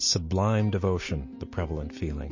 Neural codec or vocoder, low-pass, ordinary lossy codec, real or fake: none; 7.2 kHz; MP3, 32 kbps; real